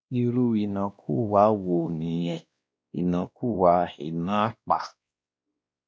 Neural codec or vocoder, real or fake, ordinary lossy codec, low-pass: codec, 16 kHz, 1 kbps, X-Codec, WavLM features, trained on Multilingual LibriSpeech; fake; none; none